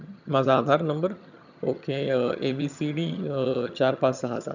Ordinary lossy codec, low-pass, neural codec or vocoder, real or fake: none; 7.2 kHz; vocoder, 22.05 kHz, 80 mel bands, HiFi-GAN; fake